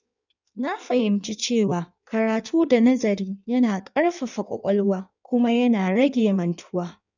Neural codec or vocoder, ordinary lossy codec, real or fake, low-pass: codec, 16 kHz in and 24 kHz out, 1.1 kbps, FireRedTTS-2 codec; none; fake; 7.2 kHz